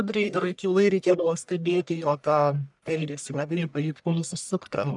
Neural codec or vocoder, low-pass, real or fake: codec, 44.1 kHz, 1.7 kbps, Pupu-Codec; 10.8 kHz; fake